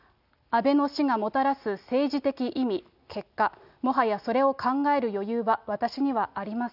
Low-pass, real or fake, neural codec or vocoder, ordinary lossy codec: 5.4 kHz; real; none; none